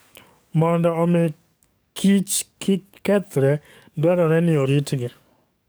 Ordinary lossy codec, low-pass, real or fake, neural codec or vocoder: none; none; fake; codec, 44.1 kHz, 7.8 kbps, DAC